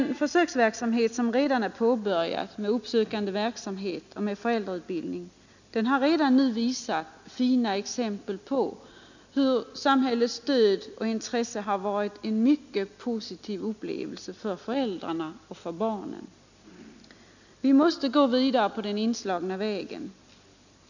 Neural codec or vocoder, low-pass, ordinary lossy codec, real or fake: none; 7.2 kHz; none; real